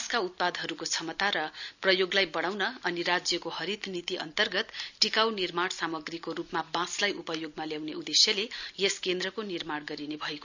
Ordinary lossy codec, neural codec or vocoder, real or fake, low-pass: none; none; real; 7.2 kHz